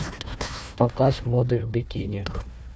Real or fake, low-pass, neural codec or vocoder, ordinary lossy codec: fake; none; codec, 16 kHz, 1 kbps, FunCodec, trained on Chinese and English, 50 frames a second; none